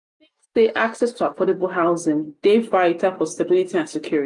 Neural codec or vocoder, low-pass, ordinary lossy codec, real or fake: none; 10.8 kHz; none; real